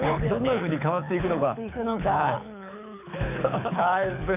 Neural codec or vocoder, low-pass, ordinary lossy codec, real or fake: codec, 24 kHz, 6 kbps, HILCodec; 3.6 kHz; none; fake